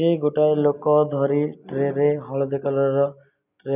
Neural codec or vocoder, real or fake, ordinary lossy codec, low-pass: none; real; none; 3.6 kHz